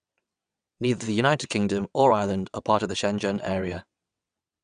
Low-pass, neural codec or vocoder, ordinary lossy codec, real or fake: 9.9 kHz; vocoder, 22.05 kHz, 80 mel bands, WaveNeXt; none; fake